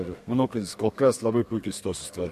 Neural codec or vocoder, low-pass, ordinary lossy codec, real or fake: codec, 32 kHz, 1.9 kbps, SNAC; 14.4 kHz; MP3, 64 kbps; fake